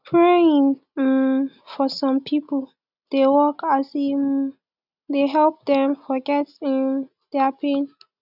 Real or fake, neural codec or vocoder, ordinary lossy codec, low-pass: real; none; none; 5.4 kHz